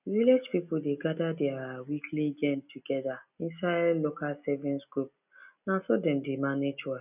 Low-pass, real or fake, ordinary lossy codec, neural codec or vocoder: 3.6 kHz; real; none; none